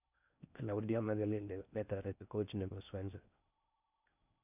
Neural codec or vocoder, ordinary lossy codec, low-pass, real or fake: codec, 16 kHz in and 24 kHz out, 0.6 kbps, FocalCodec, streaming, 4096 codes; none; 3.6 kHz; fake